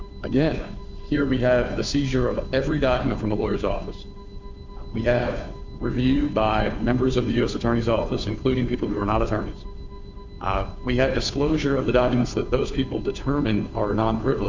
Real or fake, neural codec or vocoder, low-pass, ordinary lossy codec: fake; codec, 16 kHz, 2 kbps, FunCodec, trained on Chinese and English, 25 frames a second; 7.2 kHz; MP3, 64 kbps